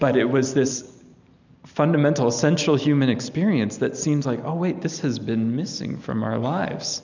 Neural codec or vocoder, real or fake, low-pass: none; real; 7.2 kHz